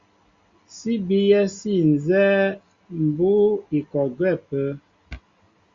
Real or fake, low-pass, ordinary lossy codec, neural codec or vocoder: real; 7.2 kHz; Opus, 64 kbps; none